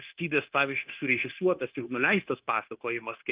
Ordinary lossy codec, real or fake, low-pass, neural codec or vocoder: Opus, 24 kbps; fake; 3.6 kHz; codec, 16 kHz, 0.9 kbps, LongCat-Audio-Codec